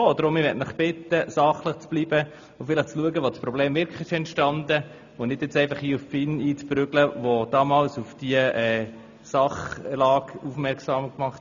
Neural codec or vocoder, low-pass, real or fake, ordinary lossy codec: none; 7.2 kHz; real; none